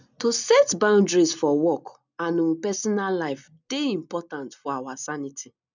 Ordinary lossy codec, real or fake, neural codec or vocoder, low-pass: none; real; none; 7.2 kHz